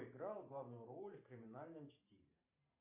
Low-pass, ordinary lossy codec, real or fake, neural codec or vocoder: 3.6 kHz; MP3, 24 kbps; fake; vocoder, 44.1 kHz, 128 mel bands every 512 samples, BigVGAN v2